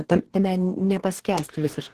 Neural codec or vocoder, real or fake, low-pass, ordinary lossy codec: codec, 44.1 kHz, 2.6 kbps, DAC; fake; 14.4 kHz; Opus, 16 kbps